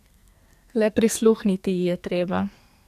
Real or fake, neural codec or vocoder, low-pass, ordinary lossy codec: fake; codec, 44.1 kHz, 2.6 kbps, SNAC; 14.4 kHz; AAC, 96 kbps